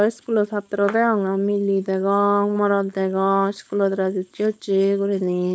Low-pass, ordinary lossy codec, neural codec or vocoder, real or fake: none; none; codec, 16 kHz, 4.8 kbps, FACodec; fake